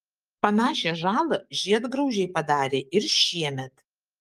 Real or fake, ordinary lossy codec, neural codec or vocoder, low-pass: fake; Opus, 32 kbps; codec, 44.1 kHz, 7.8 kbps, DAC; 14.4 kHz